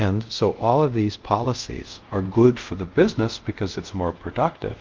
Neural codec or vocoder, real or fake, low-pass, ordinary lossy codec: codec, 24 kHz, 0.5 kbps, DualCodec; fake; 7.2 kHz; Opus, 32 kbps